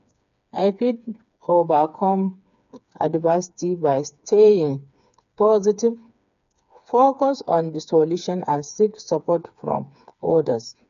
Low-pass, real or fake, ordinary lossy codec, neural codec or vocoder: 7.2 kHz; fake; none; codec, 16 kHz, 4 kbps, FreqCodec, smaller model